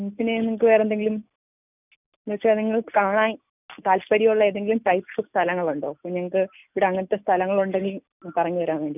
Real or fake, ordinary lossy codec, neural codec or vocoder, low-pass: real; none; none; 3.6 kHz